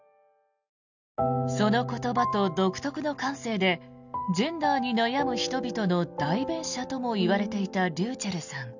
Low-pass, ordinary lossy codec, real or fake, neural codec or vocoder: 7.2 kHz; none; real; none